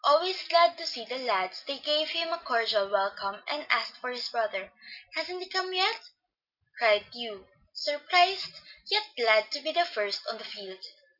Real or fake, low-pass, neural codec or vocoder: real; 5.4 kHz; none